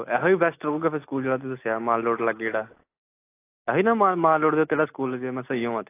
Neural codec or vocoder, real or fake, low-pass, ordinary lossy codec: none; real; 3.6 kHz; AAC, 24 kbps